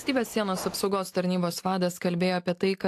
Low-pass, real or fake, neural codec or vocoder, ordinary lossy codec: 14.4 kHz; real; none; AAC, 64 kbps